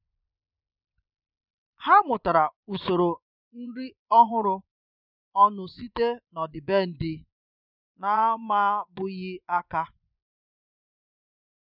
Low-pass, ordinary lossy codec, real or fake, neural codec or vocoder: 5.4 kHz; none; fake; vocoder, 24 kHz, 100 mel bands, Vocos